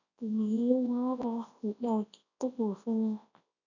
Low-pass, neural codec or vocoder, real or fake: 7.2 kHz; codec, 24 kHz, 0.9 kbps, WavTokenizer, large speech release; fake